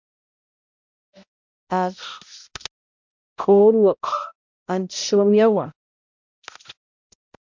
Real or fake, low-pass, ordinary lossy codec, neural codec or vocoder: fake; 7.2 kHz; MP3, 64 kbps; codec, 16 kHz, 0.5 kbps, X-Codec, HuBERT features, trained on balanced general audio